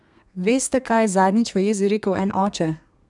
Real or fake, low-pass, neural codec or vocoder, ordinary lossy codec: fake; 10.8 kHz; codec, 32 kHz, 1.9 kbps, SNAC; none